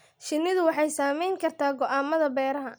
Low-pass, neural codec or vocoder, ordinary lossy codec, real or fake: none; none; none; real